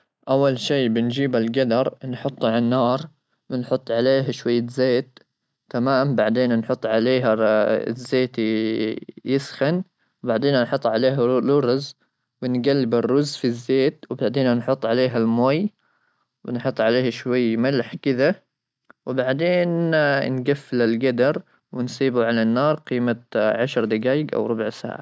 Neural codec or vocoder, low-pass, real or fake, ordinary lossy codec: none; none; real; none